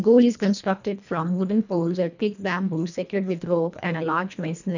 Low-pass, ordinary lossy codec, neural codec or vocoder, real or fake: 7.2 kHz; none; codec, 24 kHz, 1.5 kbps, HILCodec; fake